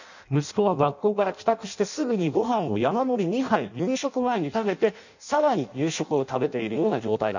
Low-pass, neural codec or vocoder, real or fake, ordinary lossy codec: 7.2 kHz; codec, 16 kHz in and 24 kHz out, 0.6 kbps, FireRedTTS-2 codec; fake; none